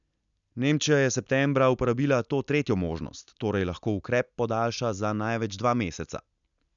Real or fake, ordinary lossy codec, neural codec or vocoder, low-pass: real; none; none; 7.2 kHz